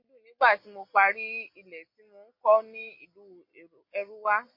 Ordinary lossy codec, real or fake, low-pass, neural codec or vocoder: MP3, 32 kbps; real; 5.4 kHz; none